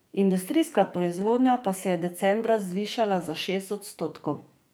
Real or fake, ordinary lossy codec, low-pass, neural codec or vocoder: fake; none; none; codec, 44.1 kHz, 2.6 kbps, SNAC